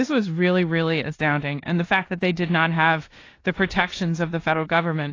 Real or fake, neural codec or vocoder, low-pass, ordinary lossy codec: fake; codec, 16 kHz in and 24 kHz out, 0.9 kbps, LongCat-Audio-Codec, four codebook decoder; 7.2 kHz; AAC, 32 kbps